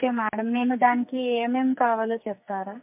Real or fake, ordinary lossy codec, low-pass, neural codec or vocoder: fake; MP3, 32 kbps; 3.6 kHz; codec, 44.1 kHz, 2.6 kbps, SNAC